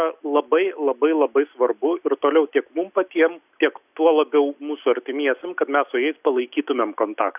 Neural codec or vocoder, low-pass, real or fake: none; 3.6 kHz; real